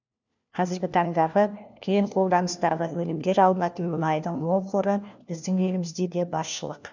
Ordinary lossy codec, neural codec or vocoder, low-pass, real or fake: none; codec, 16 kHz, 1 kbps, FunCodec, trained on LibriTTS, 50 frames a second; 7.2 kHz; fake